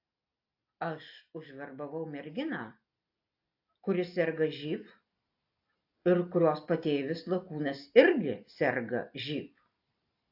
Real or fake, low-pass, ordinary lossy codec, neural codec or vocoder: real; 5.4 kHz; AAC, 48 kbps; none